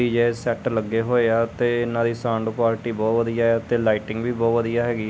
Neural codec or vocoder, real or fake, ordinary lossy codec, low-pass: none; real; none; none